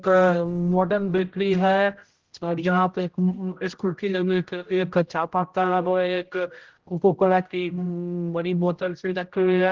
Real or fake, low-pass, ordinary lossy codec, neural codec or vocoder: fake; 7.2 kHz; Opus, 16 kbps; codec, 16 kHz, 0.5 kbps, X-Codec, HuBERT features, trained on general audio